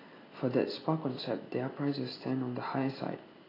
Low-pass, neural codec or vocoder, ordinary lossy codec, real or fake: 5.4 kHz; none; AAC, 24 kbps; real